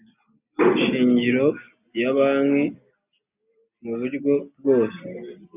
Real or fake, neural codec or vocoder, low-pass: real; none; 3.6 kHz